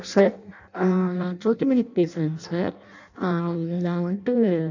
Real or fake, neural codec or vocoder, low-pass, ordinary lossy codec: fake; codec, 16 kHz in and 24 kHz out, 0.6 kbps, FireRedTTS-2 codec; 7.2 kHz; none